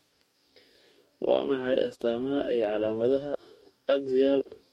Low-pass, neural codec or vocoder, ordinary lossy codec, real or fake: 19.8 kHz; codec, 44.1 kHz, 2.6 kbps, DAC; MP3, 64 kbps; fake